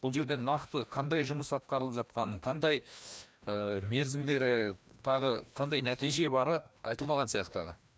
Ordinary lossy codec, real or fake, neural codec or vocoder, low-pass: none; fake; codec, 16 kHz, 1 kbps, FreqCodec, larger model; none